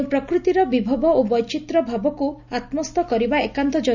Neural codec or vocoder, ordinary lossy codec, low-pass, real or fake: none; none; 7.2 kHz; real